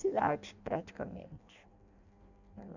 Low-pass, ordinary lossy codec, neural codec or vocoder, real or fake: 7.2 kHz; none; codec, 16 kHz in and 24 kHz out, 0.6 kbps, FireRedTTS-2 codec; fake